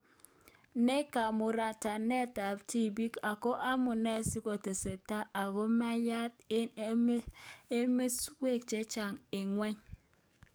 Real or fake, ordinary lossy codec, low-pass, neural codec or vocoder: fake; none; none; codec, 44.1 kHz, 7.8 kbps, DAC